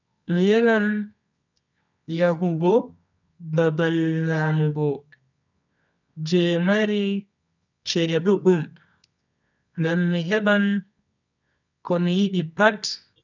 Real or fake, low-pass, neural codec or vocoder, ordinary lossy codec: fake; 7.2 kHz; codec, 24 kHz, 0.9 kbps, WavTokenizer, medium music audio release; none